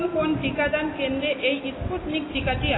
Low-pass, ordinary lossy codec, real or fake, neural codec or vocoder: 7.2 kHz; AAC, 16 kbps; real; none